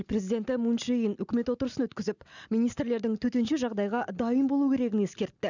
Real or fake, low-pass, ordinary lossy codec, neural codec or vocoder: real; 7.2 kHz; none; none